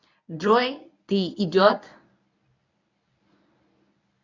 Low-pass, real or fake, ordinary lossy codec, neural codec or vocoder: 7.2 kHz; fake; none; codec, 24 kHz, 0.9 kbps, WavTokenizer, medium speech release version 1